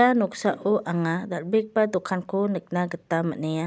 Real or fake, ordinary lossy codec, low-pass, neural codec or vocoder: real; none; none; none